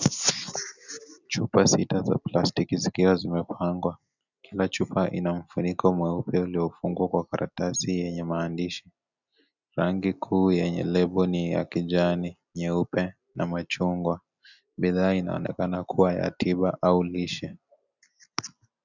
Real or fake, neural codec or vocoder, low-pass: real; none; 7.2 kHz